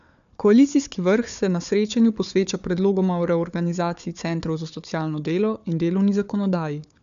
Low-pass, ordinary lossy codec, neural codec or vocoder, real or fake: 7.2 kHz; none; codec, 16 kHz, 4 kbps, FunCodec, trained on Chinese and English, 50 frames a second; fake